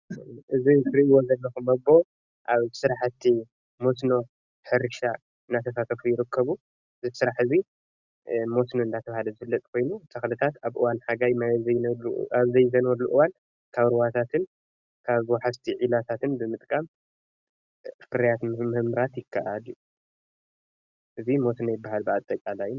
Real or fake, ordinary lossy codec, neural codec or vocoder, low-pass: real; Opus, 64 kbps; none; 7.2 kHz